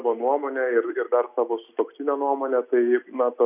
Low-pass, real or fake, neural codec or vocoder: 3.6 kHz; real; none